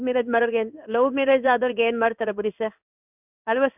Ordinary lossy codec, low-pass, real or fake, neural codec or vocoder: none; 3.6 kHz; fake; codec, 16 kHz in and 24 kHz out, 1 kbps, XY-Tokenizer